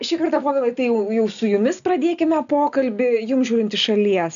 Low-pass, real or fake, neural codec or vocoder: 7.2 kHz; real; none